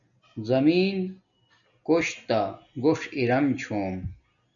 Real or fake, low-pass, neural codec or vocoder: real; 7.2 kHz; none